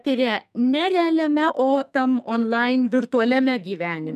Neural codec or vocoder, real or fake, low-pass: codec, 44.1 kHz, 2.6 kbps, SNAC; fake; 14.4 kHz